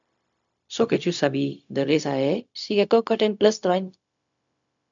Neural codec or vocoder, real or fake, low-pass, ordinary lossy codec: codec, 16 kHz, 0.4 kbps, LongCat-Audio-Codec; fake; 7.2 kHz; MP3, 64 kbps